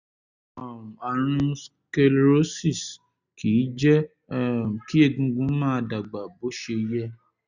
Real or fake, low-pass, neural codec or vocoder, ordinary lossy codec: real; 7.2 kHz; none; none